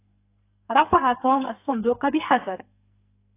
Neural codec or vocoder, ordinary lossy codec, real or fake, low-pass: codec, 44.1 kHz, 2.6 kbps, SNAC; AAC, 24 kbps; fake; 3.6 kHz